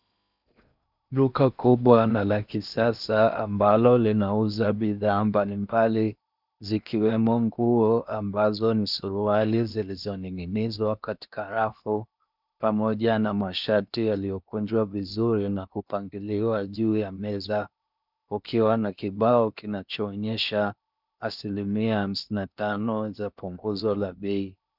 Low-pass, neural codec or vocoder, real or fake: 5.4 kHz; codec, 16 kHz in and 24 kHz out, 0.8 kbps, FocalCodec, streaming, 65536 codes; fake